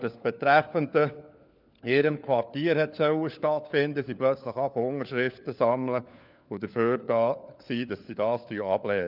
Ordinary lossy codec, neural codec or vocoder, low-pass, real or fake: none; codec, 16 kHz, 4 kbps, FunCodec, trained on LibriTTS, 50 frames a second; 5.4 kHz; fake